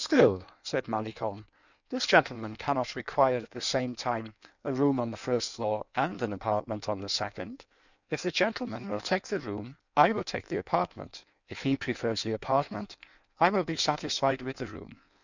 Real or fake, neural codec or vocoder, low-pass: fake; codec, 16 kHz in and 24 kHz out, 1.1 kbps, FireRedTTS-2 codec; 7.2 kHz